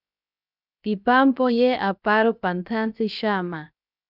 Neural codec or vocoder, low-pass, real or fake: codec, 16 kHz, 0.7 kbps, FocalCodec; 5.4 kHz; fake